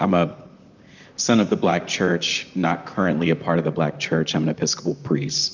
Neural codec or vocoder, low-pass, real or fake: vocoder, 44.1 kHz, 128 mel bands, Pupu-Vocoder; 7.2 kHz; fake